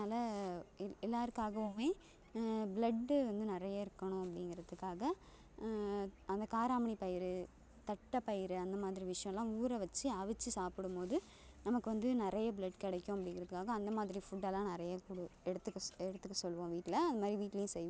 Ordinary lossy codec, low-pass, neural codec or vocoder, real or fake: none; none; none; real